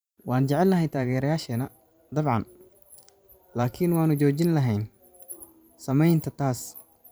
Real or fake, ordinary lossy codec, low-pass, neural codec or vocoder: fake; none; none; vocoder, 44.1 kHz, 128 mel bands every 512 samples, BigVGAN v2